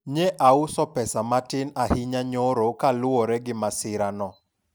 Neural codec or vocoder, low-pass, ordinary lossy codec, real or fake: none; none; none; real